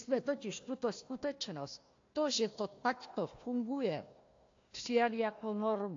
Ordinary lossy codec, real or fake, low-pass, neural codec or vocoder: AAC, 48 kbps; fake; 7.2 kHz; codec, 16 kHz, 1 kbps, FunCodec, trained on Chinese and English, 50 frames a second